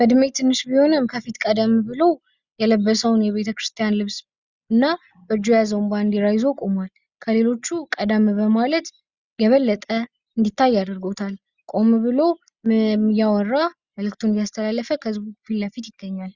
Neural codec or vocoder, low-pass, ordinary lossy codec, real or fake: none; 7.2 kHz; Opus, 64 kbps; real